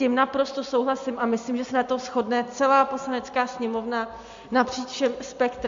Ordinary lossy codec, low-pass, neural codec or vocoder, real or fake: MP3, 48 kbps; 7.2 kHz; none; real